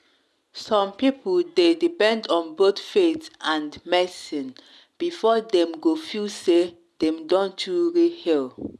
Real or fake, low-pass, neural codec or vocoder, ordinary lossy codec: real; none; none; none